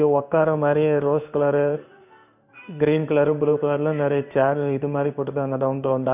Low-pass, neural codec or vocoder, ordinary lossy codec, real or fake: 3.6 kHz; codec, 16 kHz in and 24 kHz out, 1 kbps, XY-Tokenizer; none; fake